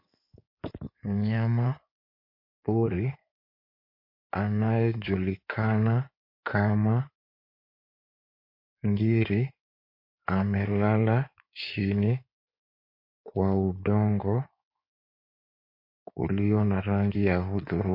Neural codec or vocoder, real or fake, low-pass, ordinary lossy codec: codec, 16 kHz in and 24 kHz out, 2.2 kbps, FireRedTTS-2 codec; fake; 5.4 kHz; MP3, 32 kbps